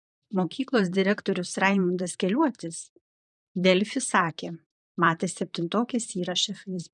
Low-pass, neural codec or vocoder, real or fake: 10.8 kHz; vocoder, 44.1 kHz, 128 mel bands, Pupu-Vocoder; fake